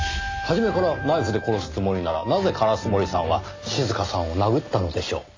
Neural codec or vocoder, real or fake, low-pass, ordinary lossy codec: none; real; 7.2 kHz; AAC, 32 kbps